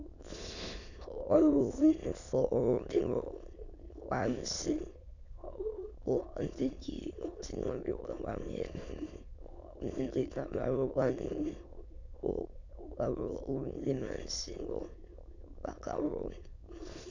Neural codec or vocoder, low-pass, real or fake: autoencoder, 22.05 kHz, a latent of 192 numbers a frame, VITS, trained on many speakers; 7.2 kHz; fake